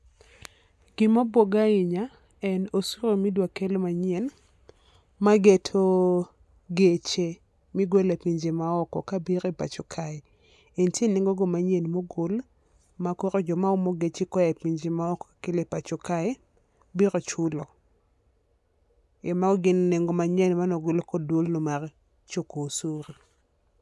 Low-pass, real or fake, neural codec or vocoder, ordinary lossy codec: none; real; none; none